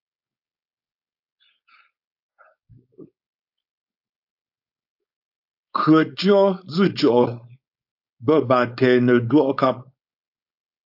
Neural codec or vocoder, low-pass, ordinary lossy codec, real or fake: codec, 16 kHz, 4.8 kbps, FACodec; 5.4 kHz; AAC, 48 kbps; fake